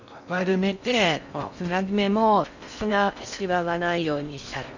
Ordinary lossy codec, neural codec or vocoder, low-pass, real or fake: none; codec, 16 kHz in and 24 kHz out, 0.8 kbps, FocalCodec, streaming, 65536 codes; 7.2 kHz; fake